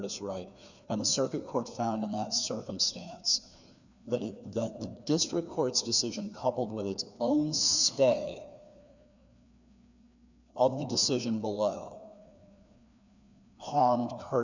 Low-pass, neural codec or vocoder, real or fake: 7.2 kHz; codec, 16 kHz, 2 kbps, FreqCodec, larger model; fake